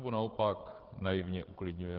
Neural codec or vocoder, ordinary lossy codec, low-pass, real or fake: codec, 16 kHz, 8 kbps, FreqCodec, larger model; Opus, 16 kbps; 5.4 kHz; fake